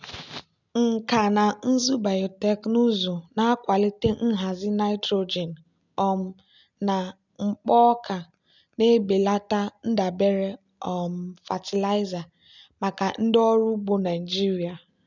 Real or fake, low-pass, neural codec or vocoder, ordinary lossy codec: real; 7.2 kHz; none; none